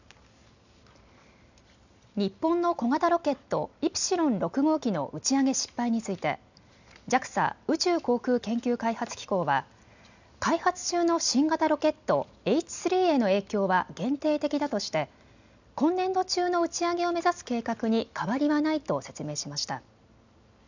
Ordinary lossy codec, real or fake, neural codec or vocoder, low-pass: none; real; none; 7.2 kHz